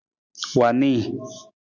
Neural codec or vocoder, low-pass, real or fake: none; 7.2 kHz; real